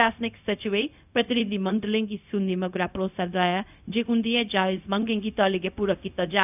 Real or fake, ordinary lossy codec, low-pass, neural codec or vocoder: fake; none; 3.6 kHz; codec, 16 kHz, 0.4 kbps, LongCat-Audio-Codec